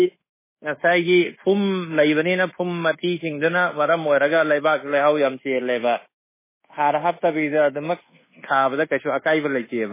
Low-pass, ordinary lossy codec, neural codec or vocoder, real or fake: 3.6 kHz; MP3, 16 kbps; codec, 24 kHz, 1.2 kbps, DualCodec; fake